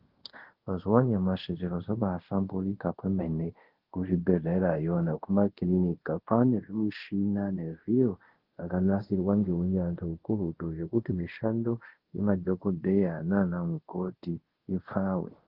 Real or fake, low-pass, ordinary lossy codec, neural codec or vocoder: fake; 5.4 kHz; Opus, 16 kbps; codec, 24 kHz, 0.5 kbps, DualCodec